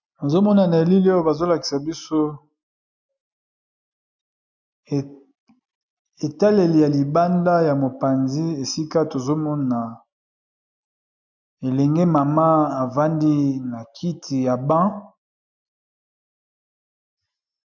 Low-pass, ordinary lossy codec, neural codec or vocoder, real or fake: 7.2 kHz; MP3, 64 kbps; none; real